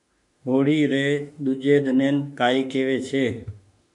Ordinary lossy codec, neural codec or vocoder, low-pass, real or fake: MP3, 64 kbps; autoencoder, 48 kHz, 32 numbers a frame, DAC-VAE, trained on Japanese speech; 10.8 kHz; fake